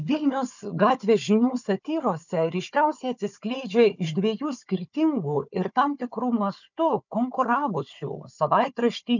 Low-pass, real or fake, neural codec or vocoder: 7.2 kHz; fake; codec, 16 kHz, 4 kbps, FunCodec, trained on Chinese and English, 50 frames a second